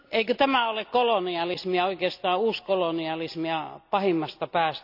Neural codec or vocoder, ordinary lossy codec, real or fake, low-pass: none; none; real; 5.4 kHz